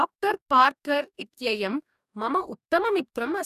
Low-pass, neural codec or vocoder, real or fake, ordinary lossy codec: 14.4 kHz; codec, 44.1 kHz, 2.6 kbps, DAC; fake; none